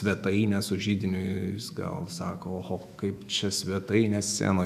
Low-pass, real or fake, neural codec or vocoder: 14.4 kHz; fake; autoencoder, 48 kHz, 128 numbers a frame, DAC-VAE, trained on Japanese speech